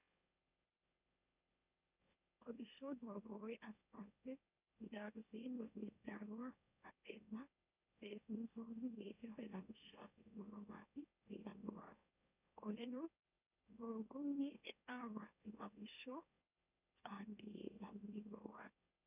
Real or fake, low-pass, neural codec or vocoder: fake; 3.6 kHz; autoencoder, 44.1 kHz, a latent of 192 numbers a frame, MeloTTS